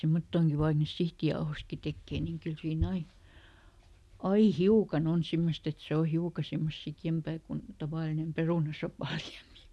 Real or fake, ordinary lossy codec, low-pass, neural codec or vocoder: real; none; none; none